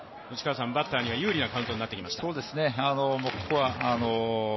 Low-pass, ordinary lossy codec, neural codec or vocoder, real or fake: 7.2 kHz; MP3, 24 kbps; none; real